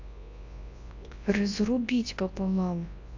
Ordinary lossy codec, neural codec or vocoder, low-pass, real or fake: AAC, 48 kbps; codec, 24 kHz, 0.9 kbps, WavTokenizer, large speech release; 7.2 kHz; fake